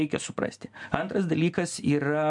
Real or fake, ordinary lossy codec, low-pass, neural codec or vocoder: real; MP3, 64 kbps; 10.8 kHz; none